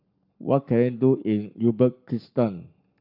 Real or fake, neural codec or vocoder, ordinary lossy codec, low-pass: fake; codec, 44.1 kHz, 7.8 kbps, Pupu-Codec; AAC, 48 kbps; 5.4 kHz